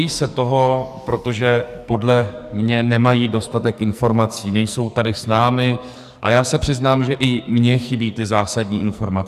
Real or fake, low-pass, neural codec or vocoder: fake; 14.4 kHz; codec, 44.1 kHz, 2.6 kbps, SNAC